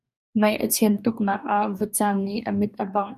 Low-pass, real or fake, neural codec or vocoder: 14.4 kHz; fake; codec, 44.1 kHz, 2.6 kbps, DAC